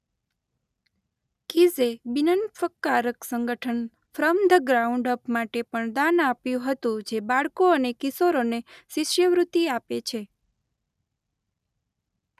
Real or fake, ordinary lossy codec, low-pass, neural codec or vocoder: real; none; 14.4 kHz; none